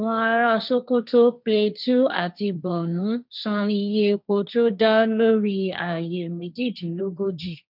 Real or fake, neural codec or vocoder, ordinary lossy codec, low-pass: fake; codec, 16 kHz, 1.1 kbps, Voila-Tokenizer; none; 5.4 kHz